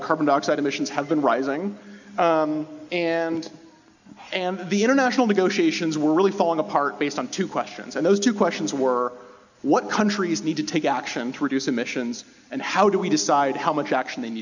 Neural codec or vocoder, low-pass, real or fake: none; 7.2 kHz; real